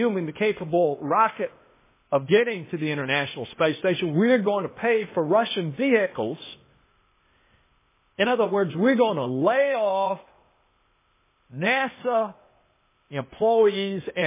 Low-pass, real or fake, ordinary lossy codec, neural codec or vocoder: 3.6 kHz; fake; MP3, 16 kbps; codec, 16 kHz, 0.8 kbps, ZipCodec